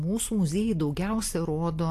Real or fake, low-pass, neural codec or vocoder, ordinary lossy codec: real; 14.4 kHz; none; AAC, 64 kbps